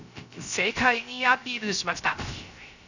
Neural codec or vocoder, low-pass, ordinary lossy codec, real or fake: codec, 16 kHz, 0.3 kbps, FocalCodec; 7.2 kHz; none; fake